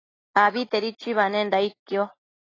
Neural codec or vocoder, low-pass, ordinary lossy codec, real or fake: none; 7.2 kHz; AAC, 32 kbps; real